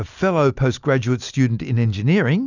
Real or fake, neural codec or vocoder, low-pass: real; none; 7.2 kHz